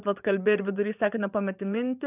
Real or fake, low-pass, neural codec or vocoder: fake; 3.6 kHz; vocoder, 24 kHz, 100 mel bands, Vocos